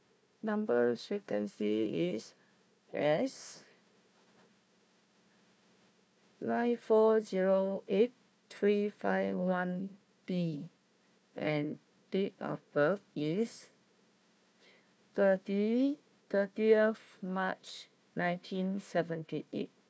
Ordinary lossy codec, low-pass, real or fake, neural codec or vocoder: none; none; fake; codec, 16 kHz, 1 kbps, FunCodec, trained on Chinese and English, 50 frames a second